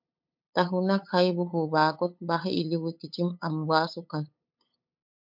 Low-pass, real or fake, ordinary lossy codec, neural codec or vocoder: 5.4 kHz; fake; MP3, 48 kbps; codec, 16 kHz, 8 kbps, FunCodec, trained on LibriTTS, 25 frames a second